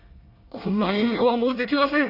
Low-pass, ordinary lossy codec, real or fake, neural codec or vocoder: 5.4 kHz; Opus, 64 kbps; fake; codec, 24 kHz, 1 kbps, SNAC